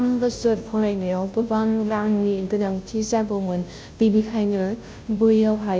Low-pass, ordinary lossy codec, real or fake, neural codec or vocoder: none; none; fake; codec, 16 kHz, 0.5 kbps, FunCodec, trained on Chinese and English, 25 frames a second